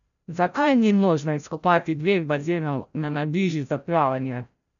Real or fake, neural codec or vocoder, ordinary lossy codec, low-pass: fake; codec, 16 kHz, 0.5 kbps, FreqCodec, larger model; none; 7.2 kHz